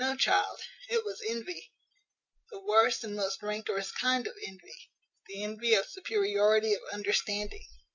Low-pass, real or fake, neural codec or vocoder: 7.2 kHz; real; none